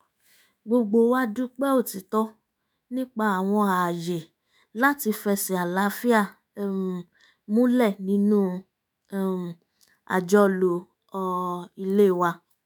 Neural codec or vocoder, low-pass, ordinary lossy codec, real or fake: autoencoder, 48 kHz, 128 numbers a frame, DAC-VAE, trained on Japanese speech; none; none; fake